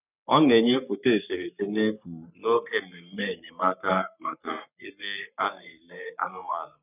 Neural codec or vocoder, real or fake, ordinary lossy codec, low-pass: codec, 44.1 kHz, 3.4 kbps, Pupu-Codec; fake; none; 3.6 kHz